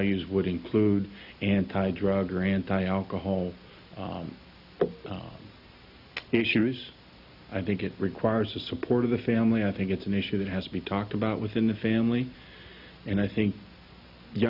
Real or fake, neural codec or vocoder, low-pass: real; none; 5.4 kHz